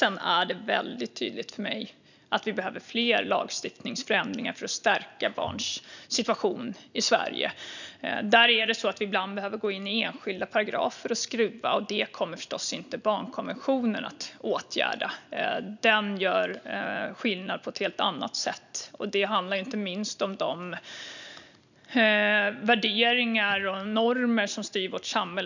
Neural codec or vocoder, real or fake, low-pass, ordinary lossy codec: none; real; 7.2 kHz; none